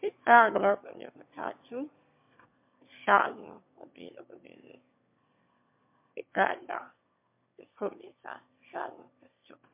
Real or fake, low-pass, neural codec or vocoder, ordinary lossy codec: fake; 3.6 kHz; autoencoder, 22.05 kHz, a latent of 192 numbers a frame, VITS, trained on one speaker; MP3, 32 kbps